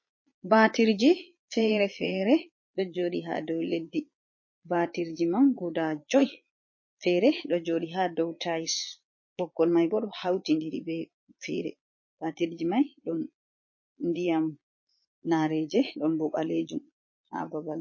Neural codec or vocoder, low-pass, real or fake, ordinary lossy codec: vocoder, 22.05 kHz, 80 mel bands, Vocos; 7.2 kHz; fake; MP3, 32 kbps